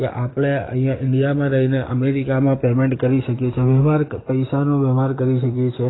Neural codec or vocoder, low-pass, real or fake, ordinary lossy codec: codec, 44.1 kHz, 7.8 kbps, DAC; 7.2 kHz; fake; AAC, 16 kbps